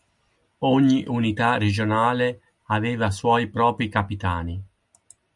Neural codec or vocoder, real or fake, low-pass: none; real; 10.8 kHz